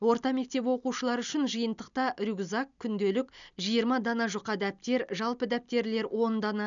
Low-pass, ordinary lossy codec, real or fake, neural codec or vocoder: 7.2 kHz; none; real; none